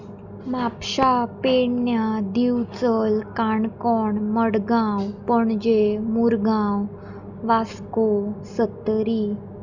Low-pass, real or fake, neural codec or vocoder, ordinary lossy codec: 7.2 kHz; real; none; none